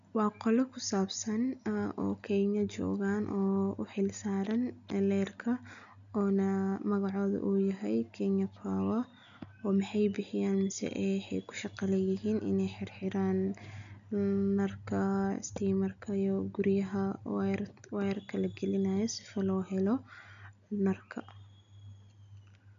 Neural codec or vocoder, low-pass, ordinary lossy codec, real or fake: none; 7.2 kHz; none; real